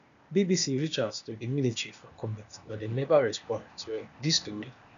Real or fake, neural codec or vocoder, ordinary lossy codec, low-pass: fake; codec, 16 kHz, 0.8 kbps, ZipCodec; MP3, 96 kbps; 7.2 kHz